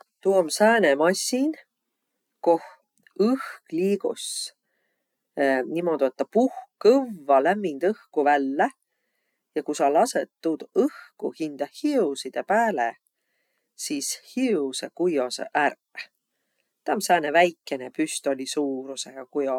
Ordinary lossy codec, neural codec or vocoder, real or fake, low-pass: none; none; real; 19.8 kHz